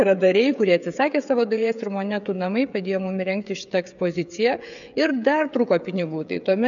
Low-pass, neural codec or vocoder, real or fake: 7.2 kHz; codec, 16 kHz, 16 kbps, FreqCodec, smaller model; fake